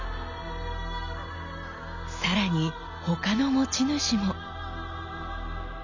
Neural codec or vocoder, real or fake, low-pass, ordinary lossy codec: none; real; 7.2 kHz; none